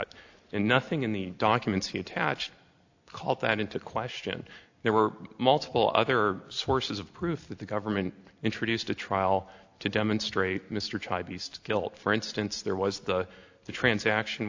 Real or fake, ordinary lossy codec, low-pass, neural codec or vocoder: real; AAC, 48 kbps; 7.2 kHz; none